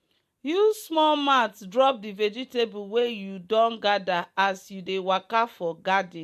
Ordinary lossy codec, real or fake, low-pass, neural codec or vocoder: MP3, 64 kbps; real; 14.4 kHz; none